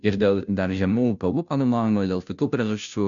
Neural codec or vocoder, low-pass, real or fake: codec, 16 kHz, 0.5 kbps, FunCodec, trained on Chinese and English, 25 frames a second; 7.2 kHz; fake